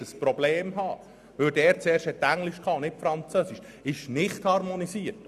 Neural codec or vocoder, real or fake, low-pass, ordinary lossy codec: none; real; 14.4 kHz; none